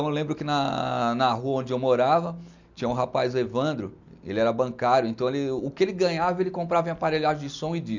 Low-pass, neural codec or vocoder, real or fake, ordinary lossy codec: 7.2 kHz; none; real; none